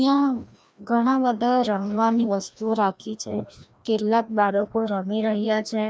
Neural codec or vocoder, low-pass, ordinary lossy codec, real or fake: codec, 16 kHz, 1 kbps, FreqCodec, larger model; none; none; fake